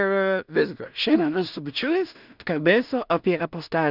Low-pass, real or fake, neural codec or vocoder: 5.4 kHz; fake; codec, 16 kHz in and 24 kHz out, 0.4 kbps, LongCat-Audio-Codec, two codebook decoder